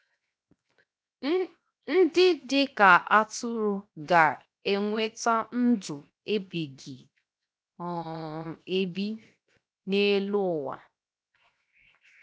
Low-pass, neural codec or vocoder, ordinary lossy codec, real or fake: none; codec, 16 kHz, 0.7 kbps, FocalCodec; none; fake